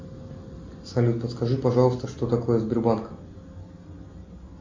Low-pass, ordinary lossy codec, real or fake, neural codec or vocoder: 7.2 kHz; MP3, 64 kbps; real; none